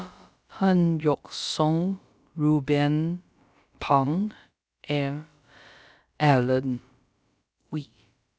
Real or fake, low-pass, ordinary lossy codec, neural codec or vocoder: fake; none; none; codec, 16 kHz, about 1 kbps, DyCAST, with the encoder's durations